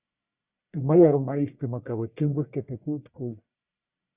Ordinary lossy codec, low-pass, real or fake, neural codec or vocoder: Opus, 64 kbps; 3.6 kHz; fake; codec, 44.1 kHz, 1.7 kbps, Pupu-Codec